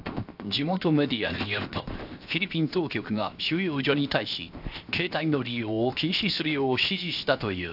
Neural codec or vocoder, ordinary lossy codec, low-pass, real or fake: codec, 16 kHz, 0.7 kbps, FocalCodec; AAC, 48 kbps; 5.4 kHz; fake